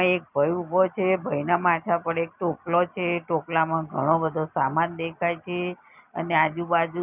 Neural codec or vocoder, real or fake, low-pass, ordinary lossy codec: none; real; 3.6 kHz; none